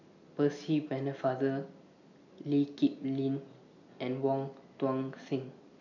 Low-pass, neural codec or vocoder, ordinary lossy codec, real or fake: 7.2 kHz; none; none; real